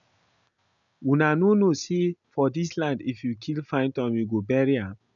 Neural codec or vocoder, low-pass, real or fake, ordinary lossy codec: none; 7.2 kHz; real; none